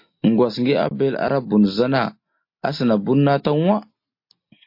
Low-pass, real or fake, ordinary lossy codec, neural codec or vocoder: 5.4 kHz; real; MP3, 32 kbps; none